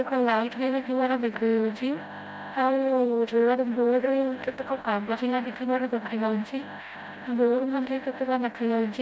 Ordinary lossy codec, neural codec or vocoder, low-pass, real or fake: none; codec, 16 kHz, 0.5 kbps, FreqCodec, smaller model; none; fake